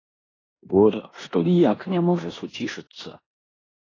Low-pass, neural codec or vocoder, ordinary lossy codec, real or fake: 7.2 kHz; codec, 16 kHz in and 24 kHz out, 0.9 kbps, LongCat-Audio-Codec, four codebook decoder; AAC, 32 kbps; fake